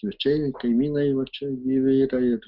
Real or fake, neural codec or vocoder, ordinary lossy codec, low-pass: real; none; Opus, 24 kbps; 5.4 kHz